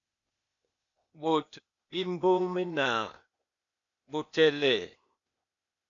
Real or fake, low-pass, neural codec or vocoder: fake; 7.2 kHz; codec, 16 kHz, 0.8 kbps, ZipCodec